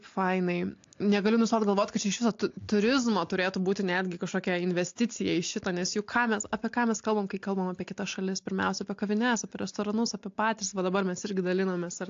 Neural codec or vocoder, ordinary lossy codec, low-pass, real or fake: none; AAC, 48 kbps; 7.2 kHz; real